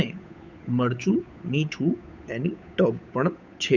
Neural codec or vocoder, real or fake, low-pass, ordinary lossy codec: codec, 16 kHz, 8 kbps, FunCodec, trained on Chinese and English, 25 frames a second; fake; 7.2 kHz; none